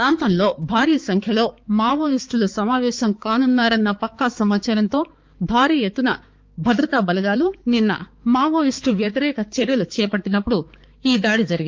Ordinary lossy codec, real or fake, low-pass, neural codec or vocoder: Opus, 24 kbps; fake; 7.2 kHz; codec, 16 kHz, 4 kbps, X-Codec, HuBERT features, trained on balanced general audio